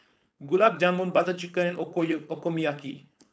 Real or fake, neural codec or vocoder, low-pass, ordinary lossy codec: fake; codec, 16 kHz, 4.8 kbps, FACodec; none; none